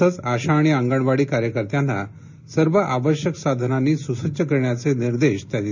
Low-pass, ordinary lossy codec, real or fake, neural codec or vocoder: 7.2 kHz; none; real; none